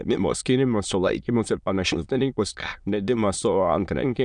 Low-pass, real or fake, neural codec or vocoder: 9.9 kHz; fake; autoencoder, 22.05 kHz, a latent of 192 numbers a frame, VITS, trained on many speakers